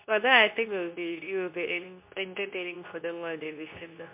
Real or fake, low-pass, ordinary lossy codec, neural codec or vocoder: fake; 3.6 kHz; MP3, 32 kbps; codec, 24 kHz, 0.9 kbps, WavTokenizer, medium speech release version 2